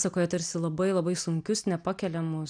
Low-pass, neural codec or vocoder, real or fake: 9.9 kHz; none; real